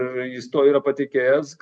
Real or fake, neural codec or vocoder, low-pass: real; none; 9.9 kHz